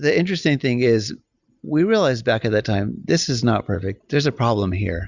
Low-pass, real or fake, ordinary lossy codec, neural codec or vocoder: 7.2 kHz; real; Opus, 64 kbps; none